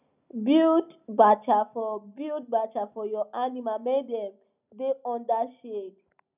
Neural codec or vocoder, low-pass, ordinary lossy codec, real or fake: none; 3.6 kHz; none; real